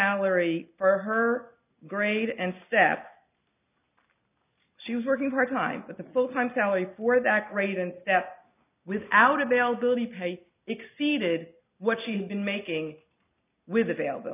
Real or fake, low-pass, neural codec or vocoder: real; 3.6 kHz; none